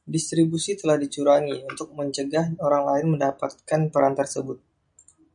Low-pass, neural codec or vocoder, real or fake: 9.9 kHz; none; real